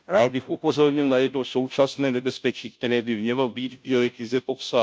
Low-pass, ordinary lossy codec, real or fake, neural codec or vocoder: none; none; fake; codec, 16 kHz, 0.5 kbps, FunCodec, trained on Chinese and English, 25 frames a second